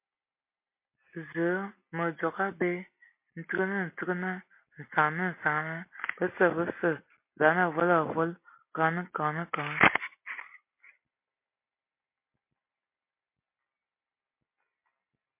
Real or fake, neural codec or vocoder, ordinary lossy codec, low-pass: real; none; MP3, 24 kbps; 3.6 kHz